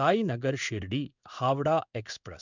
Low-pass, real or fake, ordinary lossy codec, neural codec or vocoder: 7.2 kHz; fake; none; codec, 16 kHz in and 24 kHz out, 1 kbps, XY-Tokenizer